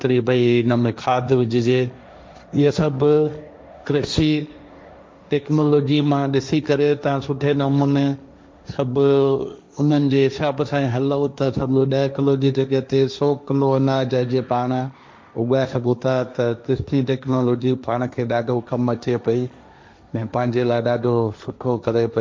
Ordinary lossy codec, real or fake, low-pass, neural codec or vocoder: none; fake; none; codec, 16 kHz, 1.1 kbps, Voila-Tokenizer